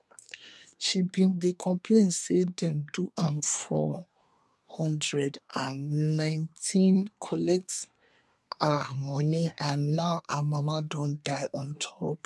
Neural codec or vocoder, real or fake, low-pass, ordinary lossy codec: codec, 24 kHz, 1 kbps, SNAC; fake; none; none